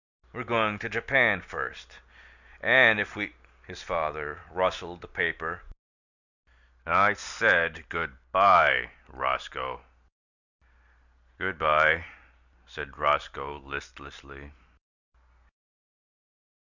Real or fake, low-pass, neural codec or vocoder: real; 7.2 kHz; none